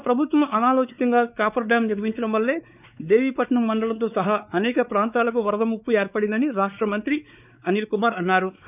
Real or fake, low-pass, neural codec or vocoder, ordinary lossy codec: fake; 3.6 kHz; codec, 16 kHz, 4 kbps, X-Codec, WavLM features, trained on Multilingual LibriSpeech; none